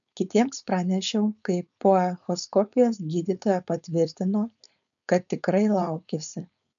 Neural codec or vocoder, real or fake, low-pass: codec, 16 kHz, 4.8 kbps, FACodec; fake; 7.2 kHz